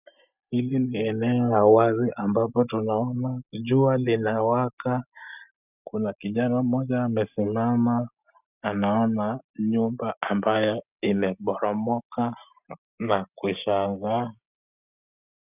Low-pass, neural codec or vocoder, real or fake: 3.6 kHz; none; real